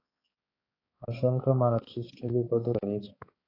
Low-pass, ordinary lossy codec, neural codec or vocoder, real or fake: 5.4 kHz; AAC, 24 kbps; codec, 16 kHz, 4 kbps, X-Codec, HuBERT features, trained on balanced general audio; fake